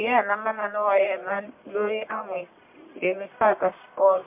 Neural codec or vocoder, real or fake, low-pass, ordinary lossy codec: codec, 44.1 kHz, 1.7 kbps, Pupu-Codec; fake; 3.6 kHz; MP3, 32 kbps